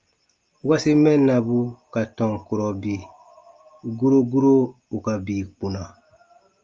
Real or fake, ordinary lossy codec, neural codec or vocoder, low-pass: real; Opus, 24 kbps; none; 7.2 kHz